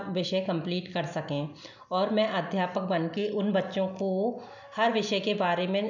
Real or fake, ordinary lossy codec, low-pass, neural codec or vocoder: real; none; 7.2 kHz; none